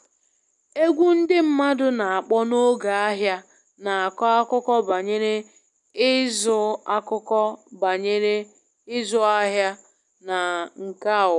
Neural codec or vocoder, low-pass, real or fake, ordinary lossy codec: none; 10.8 kHz; real; none